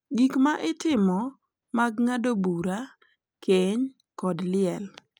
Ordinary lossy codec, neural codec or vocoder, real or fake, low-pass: none; none; real; 19.8 kHz